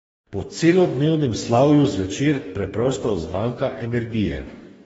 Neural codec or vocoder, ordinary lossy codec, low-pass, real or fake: codec, 44.1 kHz, 2.6 kbps, DAC; AAC, 24 kbps; 19.8 kHz; fake